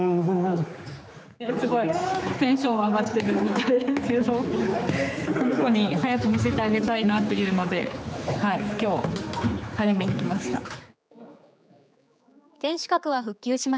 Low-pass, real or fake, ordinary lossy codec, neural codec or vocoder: none; fake; none; codec, 16 kHz, 4 kbps, X-Codec, HuBERT features, trained on general audio